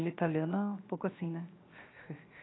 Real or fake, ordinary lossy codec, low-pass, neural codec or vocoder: fake; AAC, 16 kbps; 7.2 kHz; codec, 16 kHz, 0.7 kbps, FocalCodec